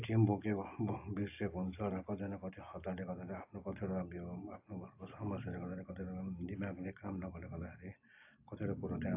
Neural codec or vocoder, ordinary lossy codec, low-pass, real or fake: none; AAC, 32 kbps; 3.6 kHz; real